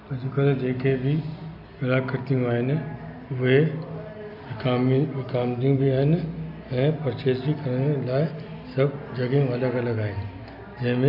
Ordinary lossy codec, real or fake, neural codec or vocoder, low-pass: none; real; none; 5.4 kHz